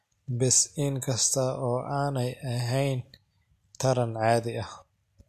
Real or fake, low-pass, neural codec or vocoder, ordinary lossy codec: real; 14.4 kHz; none; MP3, 64 kbps